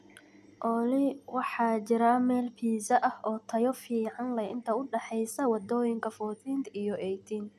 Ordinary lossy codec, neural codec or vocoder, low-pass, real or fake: none; none; 14.4 kHz; real